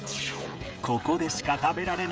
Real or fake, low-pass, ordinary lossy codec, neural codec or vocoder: fake; none; none; codec, 16 kHz, 16 kbps, FreqCodec, smaller model